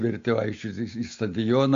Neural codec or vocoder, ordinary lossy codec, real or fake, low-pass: none; AAC, 48 kbps; real; 7.2 kHz